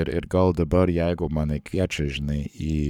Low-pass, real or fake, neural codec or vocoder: 19.8 kHz; fake; codec, 44.1 kHz, 7.8 kbps, Pupu-Codec